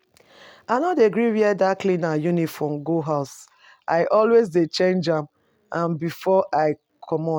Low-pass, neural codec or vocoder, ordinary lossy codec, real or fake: 19.8 kHz; none; none; real